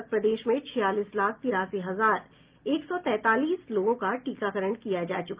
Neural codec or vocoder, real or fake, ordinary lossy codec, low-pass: none; real; Opus, 32 kbps; 3.6 kHz